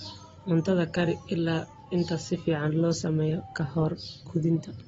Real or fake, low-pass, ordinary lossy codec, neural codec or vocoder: real; 10.8 kHz; AAC, 24 kbps; none